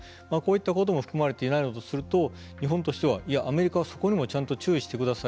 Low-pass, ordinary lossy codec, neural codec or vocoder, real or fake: none; none; none; real